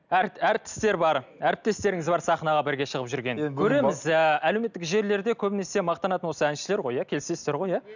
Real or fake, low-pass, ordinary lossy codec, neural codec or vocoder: real; 7.2 kHz; none; none